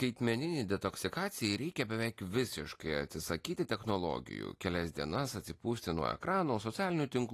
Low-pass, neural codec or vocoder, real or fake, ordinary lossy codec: 14.4 kHz; none; real; AAC, 48 kbps